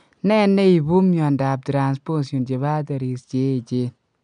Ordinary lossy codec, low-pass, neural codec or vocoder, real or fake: none; 9.9 kHz; none; real